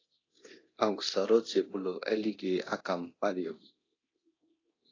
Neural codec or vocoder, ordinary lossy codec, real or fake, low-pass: codec, 24 kHz, 0.9 kbps, DualCodec; AAC, 32 kbps; fake; 7.2 kHz